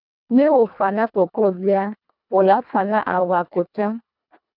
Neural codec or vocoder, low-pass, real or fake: codec, 24 kHz, 1.5 kbps, HILCodec; 5.4 kHz; fake